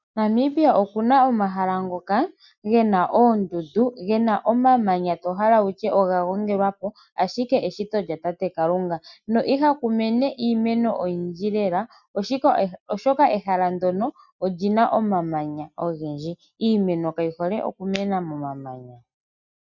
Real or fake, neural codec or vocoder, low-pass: real; none; 7.2 kHz